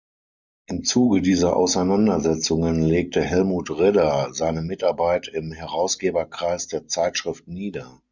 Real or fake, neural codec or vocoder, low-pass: real; none; 7.2 kHz